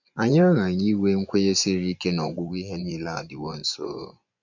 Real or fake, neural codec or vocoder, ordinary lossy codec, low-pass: fake; vocoder, 24 kHz, 100 mel bands, Vocos; none; 7.2 kHz